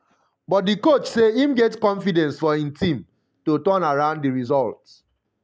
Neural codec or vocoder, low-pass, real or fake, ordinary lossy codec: none; none; real; none